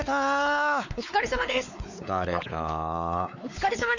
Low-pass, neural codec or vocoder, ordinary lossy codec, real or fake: 7.2 kHz; codec, 16 kHz, 4 kbps, X-Codec, WavLM features, trained on Multilingual LibriSpeech; none; fake